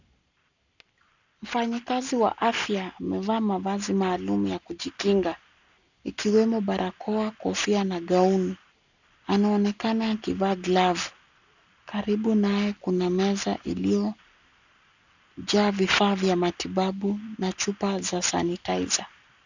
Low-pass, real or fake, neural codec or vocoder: 7.2 kHz; real; none